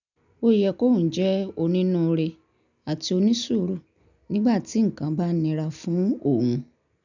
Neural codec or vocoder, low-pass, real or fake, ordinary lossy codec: none; 7.2 kHz; real; none